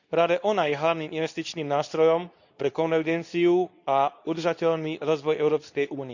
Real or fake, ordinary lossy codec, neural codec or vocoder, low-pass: fake; none; codec, 24 kHz, 0.9 kbps, WavTokenizer, medium speech release version 2; 7.2 kHz